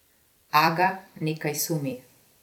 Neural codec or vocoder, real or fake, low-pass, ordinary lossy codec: vocoder, 44.1 kHz, 128 mel bands every 256 samples, BigVGAN v2; fake; 19.8 kHz; none